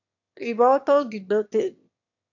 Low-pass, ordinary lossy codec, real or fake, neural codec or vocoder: 7.2 kHz; AAC, 48 kbps; fake; autoencoder, 22.05 kHz, a latent of 192 numbers a frame, VITS, trained on one speaker